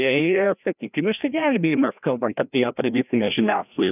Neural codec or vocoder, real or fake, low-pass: codec, 16 kHz, 1 kbps, FreqCodec, larger model; fake; 3.6 kHz